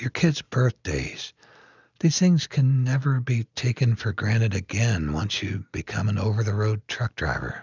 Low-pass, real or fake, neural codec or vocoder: 7.2 kHz; real; none